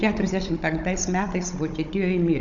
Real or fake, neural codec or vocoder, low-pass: fake; codec, 16 kHz, 16 kbps, FunCodec, trained on Chinese and English, 50 frames a second; 7.2 kHz